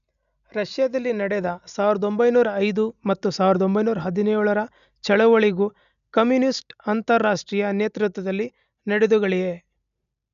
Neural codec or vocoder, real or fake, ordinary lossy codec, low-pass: none; real; none; 7.2 kHz